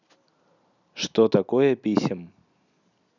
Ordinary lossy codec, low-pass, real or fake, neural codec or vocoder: none; 7.2 kHz; real; none